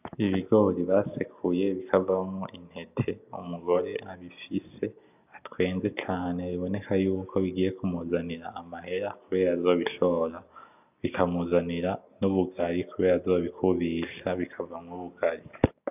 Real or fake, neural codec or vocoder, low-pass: real; none; 3.6 kHz